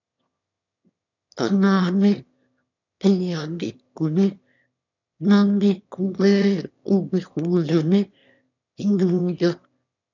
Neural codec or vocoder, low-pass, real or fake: autoencoder, 22.05 kHz, a latent of 192 numbers a frame, VITS, trained on one speaker; 7.2 kHz; fake